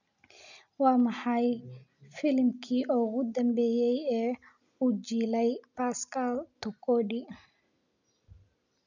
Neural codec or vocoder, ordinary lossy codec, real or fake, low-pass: none; none; real; 7.2 kHz